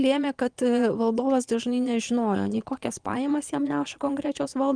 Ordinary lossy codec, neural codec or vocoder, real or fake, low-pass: Opus, 32 kbps; vocoder, 22.05 kHz, 80 mel bands, Vocos; fake; 9.9 kHz